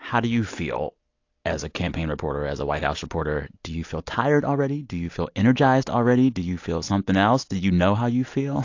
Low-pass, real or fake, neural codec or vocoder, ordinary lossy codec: 7.2 kHz; real; none; AAC, 48 kbps